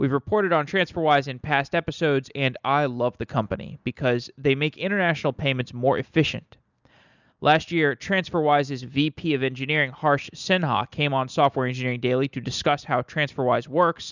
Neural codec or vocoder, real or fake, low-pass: none; real; 7.2 kHz